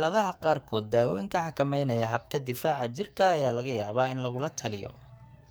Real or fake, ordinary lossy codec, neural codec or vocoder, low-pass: fake; none; codec, 44.1 kHz, 2.6 kbps, SNAC; none